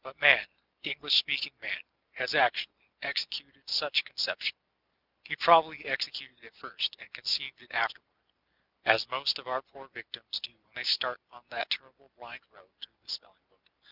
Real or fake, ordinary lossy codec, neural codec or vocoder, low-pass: real; Opus, 64 kbps; none; 5.4 kHz